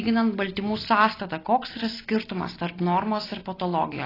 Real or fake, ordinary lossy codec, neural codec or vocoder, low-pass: real; AAC, 24 kbps; none; 5.4 kHz